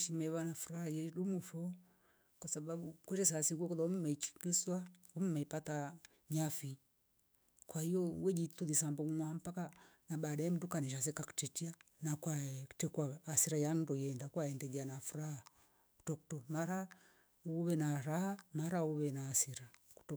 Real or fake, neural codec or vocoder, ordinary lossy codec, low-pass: fake; autoencoder, 48 kHz, 128 numbers a frame, DAC-VAE, trained on Japanese speech; none; none